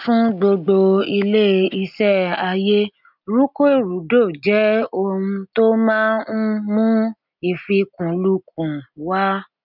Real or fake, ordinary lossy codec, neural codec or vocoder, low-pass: real; none; none; 5.4 kHz